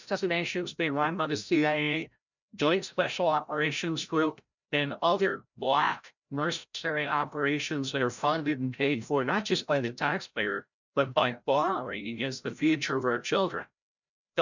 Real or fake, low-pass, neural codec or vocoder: fake; 7.2 kHz; codec, 16 kHz, 0.5 kbps, FreqCodec, larger model